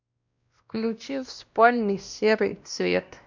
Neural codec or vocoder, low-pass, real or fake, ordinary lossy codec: codec, 16 kHz, 1 kbps, X-Codec, WavLM features, trained on Multilingual LibriSpeech; 7.2 kHz; fake; none